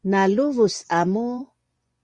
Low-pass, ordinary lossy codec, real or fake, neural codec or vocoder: 10.8 kHz; Opus, 64 kbps; fake; vocoder, 24 kHz, 100 mel bands, Vocos